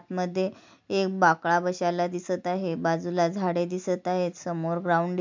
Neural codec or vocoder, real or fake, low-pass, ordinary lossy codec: none; real; 7.2 kHz; MP3, 64 kbps